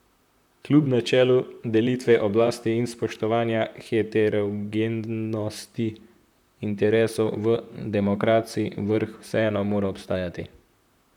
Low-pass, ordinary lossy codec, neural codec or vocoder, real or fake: 19.8 kHz; none; vocoder, 44.1 kHz, 128 mel bands, Pupu-Vocoder; fake